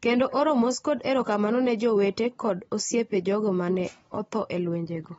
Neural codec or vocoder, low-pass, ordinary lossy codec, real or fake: none; 10.8 kHz; AAC, 24 kbps; real